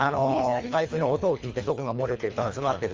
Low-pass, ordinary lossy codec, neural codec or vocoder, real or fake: 7.2 kHz; Opus, 32 kbps; codec, 24 kHz, 3 kbps, HILCodec; fake